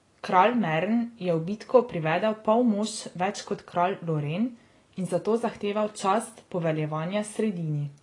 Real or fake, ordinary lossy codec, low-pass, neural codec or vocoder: real; AAC, 32 kbps; 10.8 kHz; none